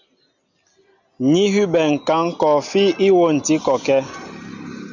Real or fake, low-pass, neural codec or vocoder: real; 7.2 kHz; none